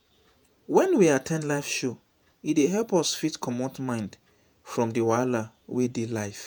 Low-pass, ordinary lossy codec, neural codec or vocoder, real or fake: none; none; vocoder, 48 kHz, 128 mel bands, Vocos; fake